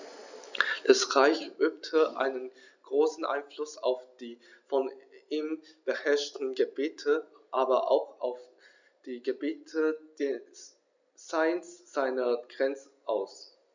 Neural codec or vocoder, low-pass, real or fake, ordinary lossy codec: none; 7.2 kHz; real; none